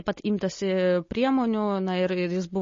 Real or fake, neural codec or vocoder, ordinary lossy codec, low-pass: real; none; MP3, 32 kbps; 7.2 kHz